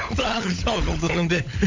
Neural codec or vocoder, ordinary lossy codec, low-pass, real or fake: codec, 16 kHz, 16 kbps, FunCodec, trained on LibriTTS, 50 frames a second; MP3, 48 kbps; 7.2 kHz; fake